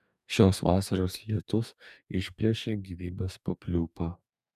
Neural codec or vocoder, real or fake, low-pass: codec, 44.1 kHz, 2.6 kbps, DAC; fake; 14.4 kHz